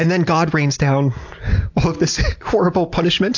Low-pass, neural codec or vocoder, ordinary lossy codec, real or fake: 7.2 kHz; none; AAC, 48 kbps; real